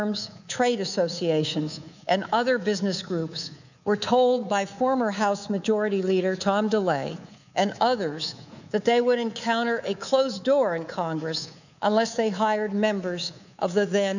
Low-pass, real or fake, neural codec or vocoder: 7.2 kHz; fake; codec, 24 kHz, 3.1 kbps, DualCodec